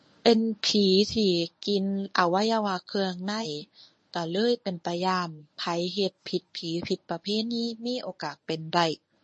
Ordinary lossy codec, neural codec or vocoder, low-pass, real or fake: MP3, 32 kbps; codec, 24 kHz, 0.9 kbps, WavTokenizer, medium speech release version 1; 10.8 kHz; fake